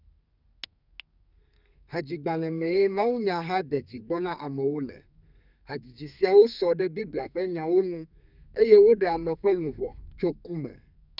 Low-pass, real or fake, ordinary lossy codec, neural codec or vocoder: 5.4 kHz; fake; none; codec, 32 kHz, 1.9 kbps, SNAC